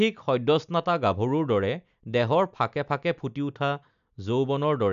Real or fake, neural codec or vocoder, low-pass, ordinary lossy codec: real; none; 7.2 kHz; none